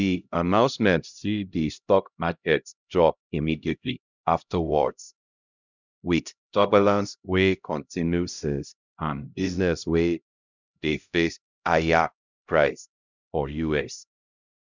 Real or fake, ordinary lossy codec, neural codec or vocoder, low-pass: fake; none; codec, 16 kHz, 0.5 kbps, X-Codec, HuBERT features, trained on LibriSpeech; 7.2 kHz